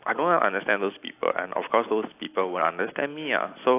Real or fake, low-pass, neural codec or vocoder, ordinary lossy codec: real; 3.6 kHz; none; AAC, 24 kbps